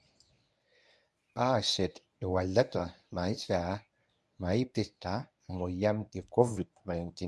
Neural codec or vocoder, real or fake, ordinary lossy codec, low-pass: codec, 24 kHz, 0.9 kbps, WavTokenizer, medium speech release version 1; fake; none; none